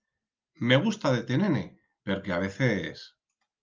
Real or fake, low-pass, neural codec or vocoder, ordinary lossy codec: real; 7.2 kHz; none; Opus, 24 kbps